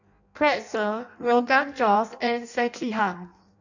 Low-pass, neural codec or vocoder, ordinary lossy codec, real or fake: 7.2 kHz; codec, 16 kHz in and 24 kHz out, 0.6 kbps, FireRedTTS-2 codec; none; fake